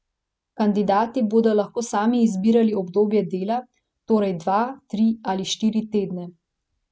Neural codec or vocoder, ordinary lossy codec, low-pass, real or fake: none; none; none; real